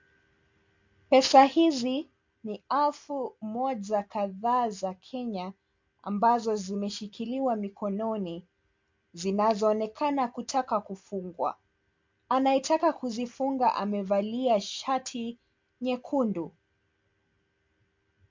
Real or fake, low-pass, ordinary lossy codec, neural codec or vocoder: real; 7.2 kHz; MP3, 48 kbps; none